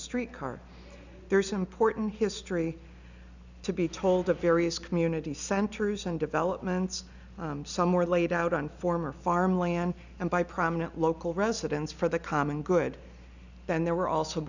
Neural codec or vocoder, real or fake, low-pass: none; real; 7.2 kHz